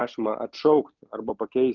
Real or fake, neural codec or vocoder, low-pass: real; none; 7.2 kHz